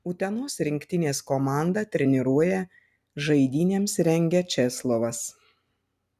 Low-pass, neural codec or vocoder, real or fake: 14.4 kHz; none; real